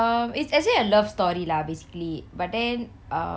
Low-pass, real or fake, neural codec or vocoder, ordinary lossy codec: none; real; none; none